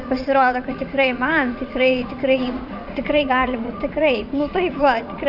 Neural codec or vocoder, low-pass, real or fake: vocoder, 44.1 kHz, 80 mel bands, Vocos; 5.4 kHz; fake